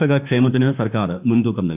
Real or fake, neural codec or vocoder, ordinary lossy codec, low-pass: fake; autoencoder, 48 kHz, 32 numbers a frame, DAC-VAE, trained on Japanese speech; AAC, 32 kbps; 3.6 kHz